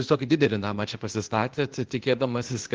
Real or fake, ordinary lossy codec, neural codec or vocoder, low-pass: fake; Opus, 16 kbps; codec, 16 kHz, 0.8 kbps, ZipCodec; 7.2 kHz